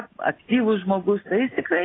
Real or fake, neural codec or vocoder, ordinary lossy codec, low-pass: real; none; AAC, 16 kbps; 7.2 kHz